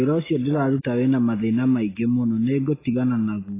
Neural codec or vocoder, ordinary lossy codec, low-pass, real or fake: none; AAC, 16 kbps; 3.6 kHz; real